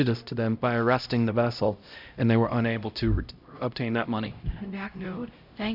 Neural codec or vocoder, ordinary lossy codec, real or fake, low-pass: codec, 16 kHz, 0.5 kbps, X-Codec, HuBERT features, trained on LibriSpeech; Opus, 64 kbps; fake; 5.4 kHz